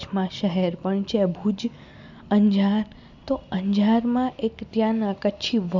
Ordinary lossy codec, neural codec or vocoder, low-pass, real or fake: none; none; 7.2 kHz; real